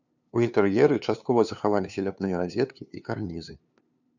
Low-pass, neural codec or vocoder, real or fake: 7.2 kHz; codec, 16 kHz, 2 kbps, FunCodec, trained on LibriTTS, 25 frames a second; fake